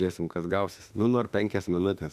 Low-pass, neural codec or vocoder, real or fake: 14.4 kHz; autoencoder, 48 kHz, 32 numbers a frame, DAC-VAE, trained on Japanese speech; fake